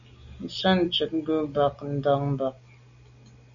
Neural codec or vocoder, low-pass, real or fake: none; 7.2 kHz; real